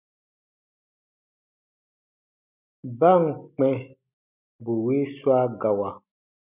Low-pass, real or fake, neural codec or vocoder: 3.6 kHz; real; none